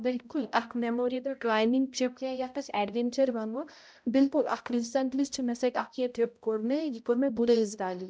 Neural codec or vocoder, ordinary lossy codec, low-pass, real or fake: codec, 16 kHz, 0.5 kbps, X-Codec, HuBERT features, trained on balanced general audio; none; none; fake